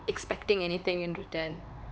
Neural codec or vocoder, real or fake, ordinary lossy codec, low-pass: codec, 16 kHz, 4 kbps, X-Codec, HuBERT features, trained on LibriSpeech; fake; none; none